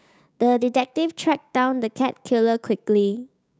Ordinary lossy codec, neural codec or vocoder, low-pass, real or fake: none; codec, 16 kHz, 6 kbps, DAC; none; fake